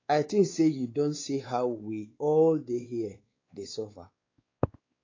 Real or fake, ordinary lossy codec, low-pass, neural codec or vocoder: fake; AAC, 32 kbps; 7.2 kHz; codec, 16 kHz, 4 kbps, X-Codec, WavLM features, trained on Multilingual LibriSpeech